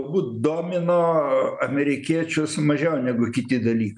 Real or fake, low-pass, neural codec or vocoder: real; 10.8 kHz; none